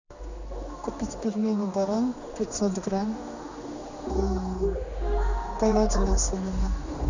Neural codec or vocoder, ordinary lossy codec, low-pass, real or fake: codec, 16 kHz, 2 kbps, X-Codec, HuBERT features, trained on general audio; Opus, 64 kbps; 7.2 kHz; fake